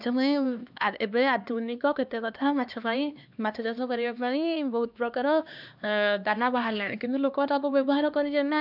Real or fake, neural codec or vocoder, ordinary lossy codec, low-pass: fake; codec, 16 kHz, 2 kbps, X-Codec, HuBERT features, trained on LibriSpeech; none; 5.4 kHz